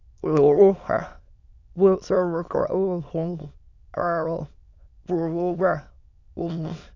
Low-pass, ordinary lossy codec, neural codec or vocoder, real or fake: 7.2 kHz; none; autoencoder, 22.05 kHz, a latent of 192 numbers a frame, VITS, trained on many speakers; fake